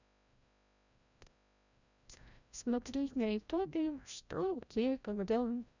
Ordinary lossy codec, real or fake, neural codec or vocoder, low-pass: none; fake; codec, 16 kHz, 0.5 kbps, FreqCodec, larger model; 7.2 kHz